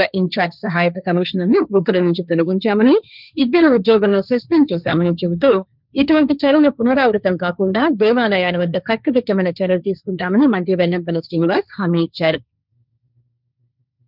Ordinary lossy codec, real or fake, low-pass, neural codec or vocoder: none; fake; 5.4 kHz; codec, 16 kHz, 1.1 kbps, Voila-Tokenizer